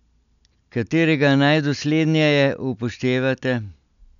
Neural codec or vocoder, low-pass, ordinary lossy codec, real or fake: none; 7.2 kHz; none; real